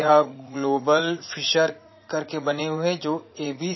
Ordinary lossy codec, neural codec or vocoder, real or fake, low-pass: MP3, 24 kbps; vocoder, 44.1 kHz, 80 mel bands, Vocos; fake; 7.2 kHz